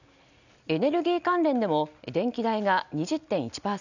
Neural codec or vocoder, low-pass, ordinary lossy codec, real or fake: none; 7.2 kHz; none; real